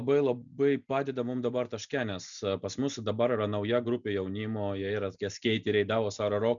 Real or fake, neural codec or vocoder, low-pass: real; none; 7.2 kHz